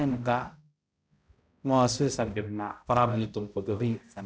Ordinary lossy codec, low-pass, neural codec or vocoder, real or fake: none; none; codec, 16 kHz, 0.5 kbps, X-Codec, HuBERT features, trained on balanced general audio; fake